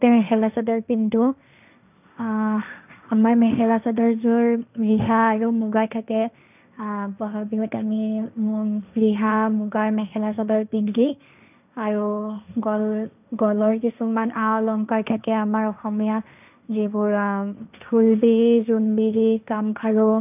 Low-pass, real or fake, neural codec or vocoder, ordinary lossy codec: 3.6 kHz; fake; codec, 16 kHz, 1.1 kbps, Voila-Tokenizer; none